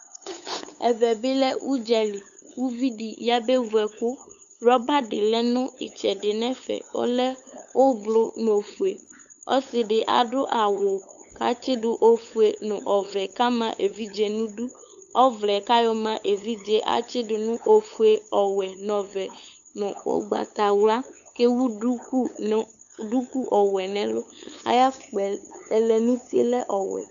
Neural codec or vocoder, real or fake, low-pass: codec, 16 kHz, 8 kbps, FunCodec, trained on LibriTTS, 25 frames a second; fake; 7.2 kHz